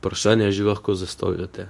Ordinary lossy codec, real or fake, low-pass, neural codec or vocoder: none; fake; none; codec, 24 kHz, 0.9 kbps, WavTokenizer, medium speech release version 2